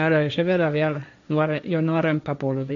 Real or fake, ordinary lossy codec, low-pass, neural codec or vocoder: fake; none; 7.2 kHz; codec, 16 kHz, 1.1 kbps, Voila-Tokenizer